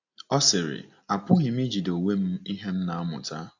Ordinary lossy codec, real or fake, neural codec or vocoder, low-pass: AAC, 48 kbps; real; none; 7.2 kHz